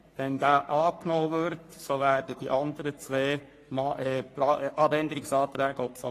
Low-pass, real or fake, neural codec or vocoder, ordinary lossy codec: 14.4 kHz; fake; codec, 44.1 kHz, 3.4 kbps, Pupu-Codec; AAC, 48 kbps